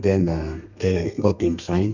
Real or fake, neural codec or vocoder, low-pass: fake; codec, 32 kHz, 1.9 kbps, SNAC; 7.2 kHz